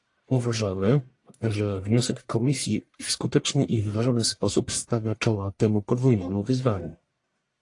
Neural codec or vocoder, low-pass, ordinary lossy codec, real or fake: codec, 44.1 kHz, 1.7 kbps, Pupu-Codec; 10.8 kHz; AAC, 48 kbps; fake